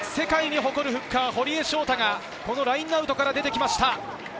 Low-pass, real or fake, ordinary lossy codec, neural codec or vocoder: none; real; none; none